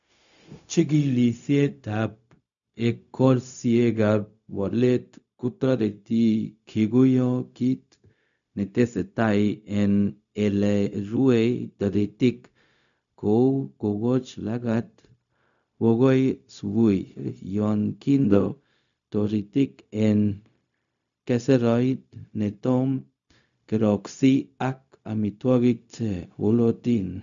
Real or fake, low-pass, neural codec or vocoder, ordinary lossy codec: fake; 7.2 kHz; codec, 16 kHz, 0.4 kbps, LongCat-Audio-Codec; none